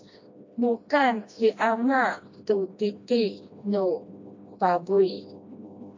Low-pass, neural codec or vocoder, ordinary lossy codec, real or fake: 7.2 kHz; codec, 16 kHz, 1 kbps, FreqCodec, smaller model; AAC, 48 kbps; fake